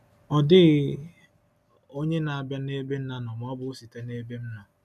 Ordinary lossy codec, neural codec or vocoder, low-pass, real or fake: none; none; 14.4 kHz; real